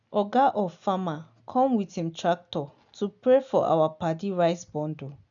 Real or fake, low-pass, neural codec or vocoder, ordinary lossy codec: real; 7.2 kHz; none; none